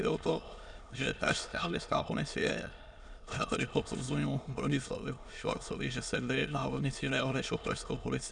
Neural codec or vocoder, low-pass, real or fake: autoencoder, 22.05 kHz, a latent of 192 numbers a frame, VITS, trained on many speakers; 9.9 kHz; fake